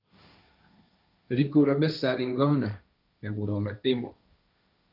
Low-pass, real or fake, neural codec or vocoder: 5.4 kHz; fake; codec, 16 kHz, 1.1 kbps, Voila-Tokenizer